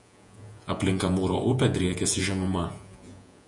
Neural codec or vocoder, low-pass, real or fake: vocoder, 48 kHz, 128 mel bands, Vocos; 10.8 kHz; fake